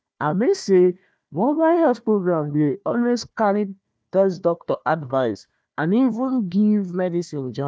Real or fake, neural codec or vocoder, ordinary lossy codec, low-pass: fake; codec, 16 kHz, 1 kbps, FunCodec, trained on Chinese and English, 50 frames a second; none; none